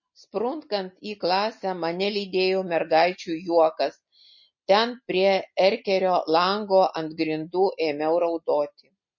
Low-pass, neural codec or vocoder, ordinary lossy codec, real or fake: 7.2 kHz; none; MP3, 32 kbps; real